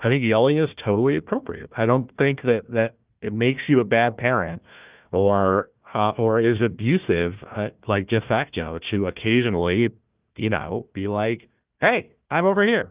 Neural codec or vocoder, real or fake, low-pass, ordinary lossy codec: codec, 16 kHz, 1 kbps, FunCodec, trained on Chinese and English, 50 frames a second; fake; 3.6 kHz; Opus, 32 kbps